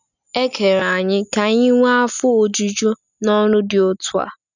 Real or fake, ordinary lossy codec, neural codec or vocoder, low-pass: real; none; none; 7.2 kHz